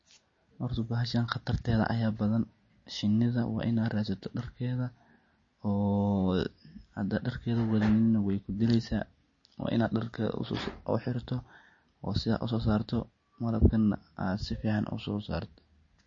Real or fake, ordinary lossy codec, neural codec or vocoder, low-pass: real; MP3, 32 kbps; none; 7.2 kHz